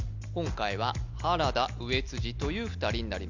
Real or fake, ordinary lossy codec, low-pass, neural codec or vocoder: real; none; 7.2 kHz; none